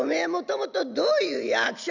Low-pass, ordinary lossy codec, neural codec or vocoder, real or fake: 7.2 kHz; none; none; real